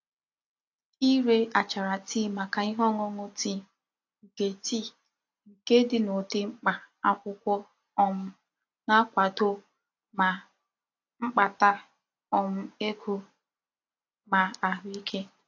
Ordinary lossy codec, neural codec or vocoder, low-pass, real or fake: AAC, 48 kbps; none; 7.2 kHz; real